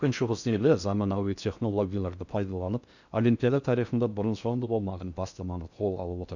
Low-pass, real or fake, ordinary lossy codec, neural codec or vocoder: 7.2 kHz; fake; none; codec, 16 kHz in and 24 kHz out, 0.6 kbps, FocalCodec, streaming, 4096 codes